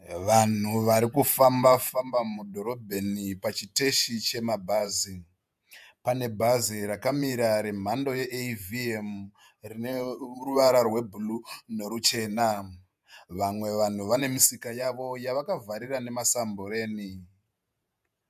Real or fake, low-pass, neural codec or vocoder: fake; 14.4 kHz; vocoder, 48 kHz, 128 mel bands, Vocos